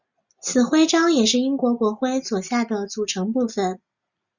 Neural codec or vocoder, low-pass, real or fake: none; 7.2 kHz; real